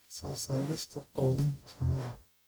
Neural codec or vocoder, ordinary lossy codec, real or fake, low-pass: codec, 44.1 kHz, 0.9 kbps, DAC; none; fake; none